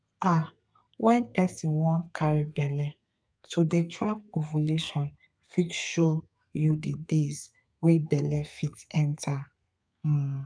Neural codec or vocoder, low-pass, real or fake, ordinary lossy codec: codec, 32 kHz, 1.9 kbps, SNAC; 9.9 kHz; fake; none